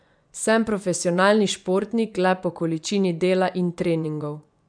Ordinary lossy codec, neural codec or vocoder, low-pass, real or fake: none; vocoder, 24 kHz, 100 mel bands, Vocos; 9.9 kHz; fake